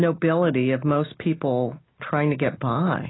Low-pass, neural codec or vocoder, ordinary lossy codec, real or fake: 7.2 kHz; none; AAC, 16 kbps; real